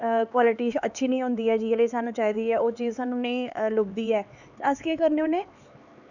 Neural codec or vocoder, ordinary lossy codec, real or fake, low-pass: codec, 16 kHz, 4 kbps, X-Codec, HuBERT features, trained on LibriSpeech; none; fake; 7.2 kHz